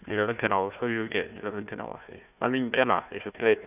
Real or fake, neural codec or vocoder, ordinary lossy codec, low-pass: fake; codec, 16 kHz, 1 kbps, FunCodec, trained on Chinese and English, 50 frames a second; none; 3.6 kHz